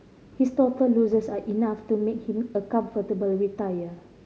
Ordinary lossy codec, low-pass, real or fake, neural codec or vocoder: none; none; real; none